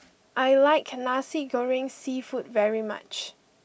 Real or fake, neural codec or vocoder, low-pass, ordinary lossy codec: real; none; none; none